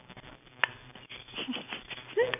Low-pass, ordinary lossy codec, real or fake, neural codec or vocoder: 3.6 kHz; none; real; none